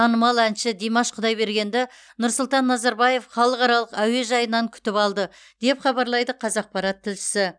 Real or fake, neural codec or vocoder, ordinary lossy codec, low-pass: real; none; none; 9.9 kHz